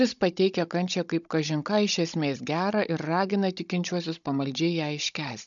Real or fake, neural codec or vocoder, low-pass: fake; codec, 16 kHz, 16 kbps, FunCodec, trained on Chinese and English, 50 frames a second; 7.2 kHz